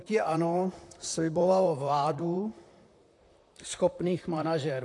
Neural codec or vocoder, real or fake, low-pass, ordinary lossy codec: vocoder, 44.1 kHz, 128 mel bands, Pupu-Vocoder; fake; 10.8 kHz; AAC, 48 kbps